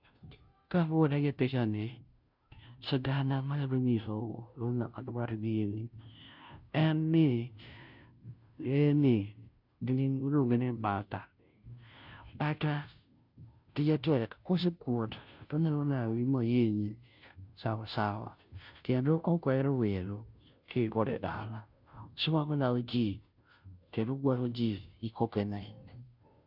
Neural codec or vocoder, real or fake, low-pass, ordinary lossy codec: codec, 16 kHz, 0.5 kbps, FunCodec, trained on Chinese and English, 25 frames a second; fake; 5.4 kHz; none